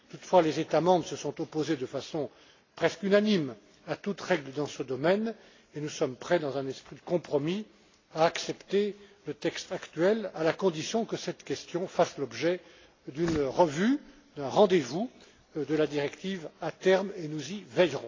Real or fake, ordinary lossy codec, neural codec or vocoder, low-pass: real; AAC, 32 kbps; none; 7.2 kHz